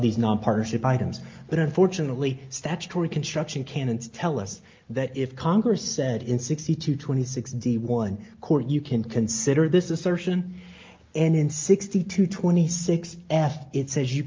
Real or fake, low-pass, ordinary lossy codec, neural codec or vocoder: real; 7.2 kHz; Opus, 24 kbps; none